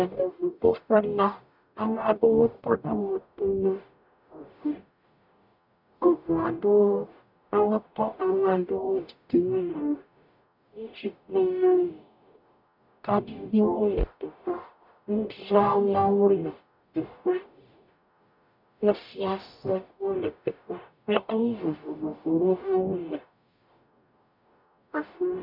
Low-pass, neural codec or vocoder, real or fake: 5.4 kHz; codec, 44.1 kHz, 0.9 kbps, DAC; fake